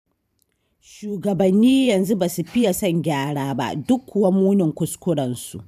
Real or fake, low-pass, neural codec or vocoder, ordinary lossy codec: fake; 14.4 kHz; vocoder, 44.1 kHz, 128 mel bands every 512 samples, BigVGAN v2; none